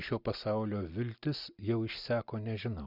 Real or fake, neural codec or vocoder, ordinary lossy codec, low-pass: real; none; Opus, 64 kbps; 5.4 kHz